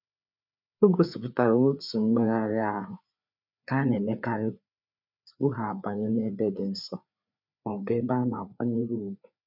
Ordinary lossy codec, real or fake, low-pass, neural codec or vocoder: none; fake; 5.4 kHz; codec, 16 kHz, 4 kbps, FreqCodec, larger model